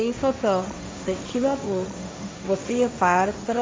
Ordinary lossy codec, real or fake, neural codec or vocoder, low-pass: none; fake; codec, 16 kHz, 1.1 kbps, Voila-Tokenizer; none